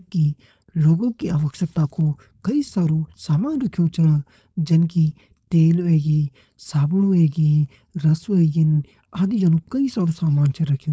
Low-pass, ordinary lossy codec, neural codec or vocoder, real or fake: none; none; codec, 16 kHz, 4.8 kbps, FACodec; fake